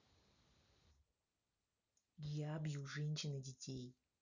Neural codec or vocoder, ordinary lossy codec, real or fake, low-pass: none; none; real; 7.2 kHz